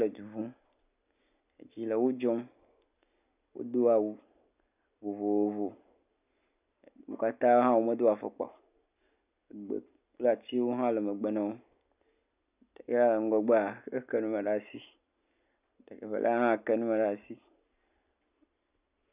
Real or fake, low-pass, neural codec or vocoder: real; 3.6 kHz; none